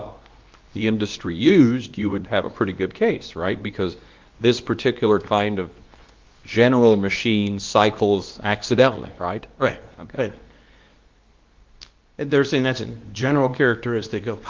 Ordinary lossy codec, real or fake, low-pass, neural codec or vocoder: Opus, 24 kbps; fake; 7.2 kHz; codec, 24 kHz, 0.9 kbps, WavTokenizer, medium speech release version 2